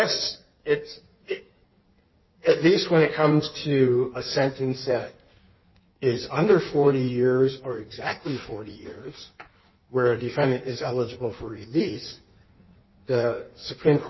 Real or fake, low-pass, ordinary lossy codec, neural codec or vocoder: fake; 7.2 kHz; MP3, 24 kbps; codec, 16 kHz in and 24 kHz out, 1.1 kbps, FireRedTTS-2 codec